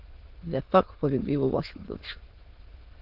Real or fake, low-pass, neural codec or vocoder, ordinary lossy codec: fake; 5.4 kHz; autoencoder, 22.05 kHz, a latent of 192 numbers a frame, VITS, trained on many speakers; Opus, 16 kbps